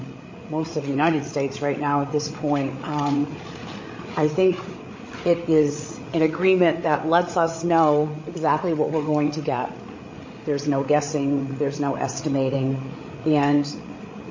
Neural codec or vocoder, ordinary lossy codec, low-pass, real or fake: codec, 16 kHz, 8 kbps, FreqCodec, larger model; MP3, 32 kbps; 7.2 kHz; fake